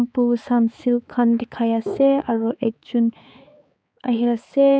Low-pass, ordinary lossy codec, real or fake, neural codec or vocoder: none; none; fake; codec, 16 kHz, 4 kbps, X-Codec, HuBERT features, trained on balanced general audio